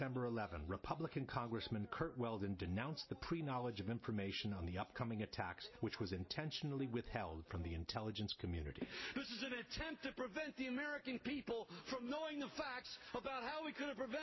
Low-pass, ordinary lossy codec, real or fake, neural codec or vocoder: 7.2 kHz; MP3, 24 kbps; real; none